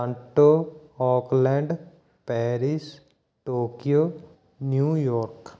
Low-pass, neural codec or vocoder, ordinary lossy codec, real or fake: none; none; none; real